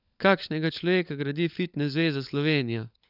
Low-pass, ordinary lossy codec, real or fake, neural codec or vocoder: 5.4 kHz; none; fake; codec, 16 kHz, 16 kbps, FunCodec, trained on LibriTTS, 50 frames a second